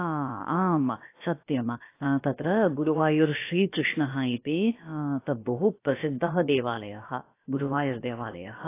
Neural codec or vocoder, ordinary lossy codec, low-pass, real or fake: codec, 16 kHz, about 1 kbps, DyCAST, with the encoder's durations; AAC, 24 kbps; 3.6 kHz; fake